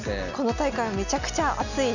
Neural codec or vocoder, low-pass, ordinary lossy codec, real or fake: none; 7.2 kHz; none; real